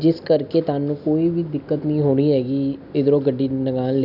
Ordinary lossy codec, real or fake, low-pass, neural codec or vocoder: none; real; 5.4 kHz; none